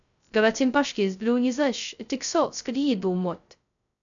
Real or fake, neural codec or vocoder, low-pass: fake; codec, 16 kHz, 0.2 kbps, FocalCodec; 7.2 kHz